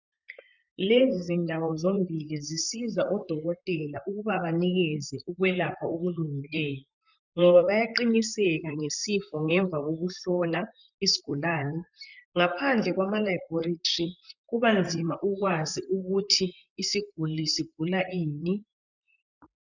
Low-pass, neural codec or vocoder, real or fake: 7.2 kHz; vocoder, 44.1 kHz, 128 mel bands, Pupu-Vocoder; fake